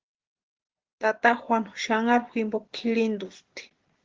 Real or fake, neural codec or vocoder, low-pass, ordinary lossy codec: real; none; 7.2 kHz; Opus, 16 kbps